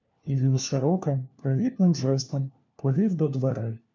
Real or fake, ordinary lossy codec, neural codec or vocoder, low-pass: fake; MP3, 64 kbps; codec, 16 kHz, 1 kbps, FunCodec, trained on LibriTTS, 50 frames a second; 7.2 kHz